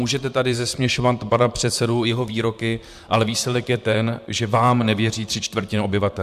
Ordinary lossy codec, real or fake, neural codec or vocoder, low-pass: MP3, 96 kbps; fake; vocoder, 44.1 kHz, 128 mel bands, Pupu-Vocoder; 14.4 kHz